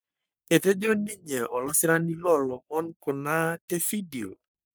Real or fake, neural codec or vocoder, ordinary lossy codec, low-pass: fake; codec, 44.1 kHz, 3.4 kbps, Pupu-Codec; none; none